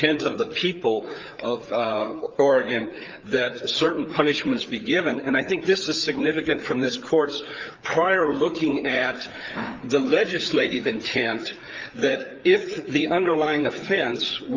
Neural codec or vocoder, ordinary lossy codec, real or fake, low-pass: codec, 16 kHz, 4 kbps, FreqCodec, larger model; Opus, 24 kbps; fake; 7.2 kHz